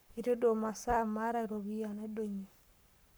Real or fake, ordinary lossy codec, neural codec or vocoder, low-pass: fake; none; codec, 44.1 kHz, 7.8 kbps, Pupu-Codec; none